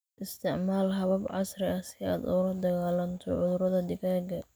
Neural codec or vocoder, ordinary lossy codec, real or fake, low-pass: none; none; real; none